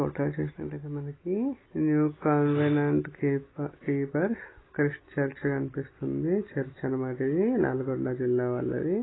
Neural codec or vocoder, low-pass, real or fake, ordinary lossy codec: none; 7.2 kHz; real; AAC, 16 kbps